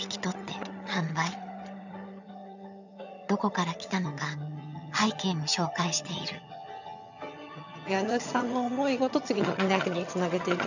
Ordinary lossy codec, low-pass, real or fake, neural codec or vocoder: none; 7.2 kHz; fake; vocoder, 22.05 kHz, 80 mel bands, HiFi-GAN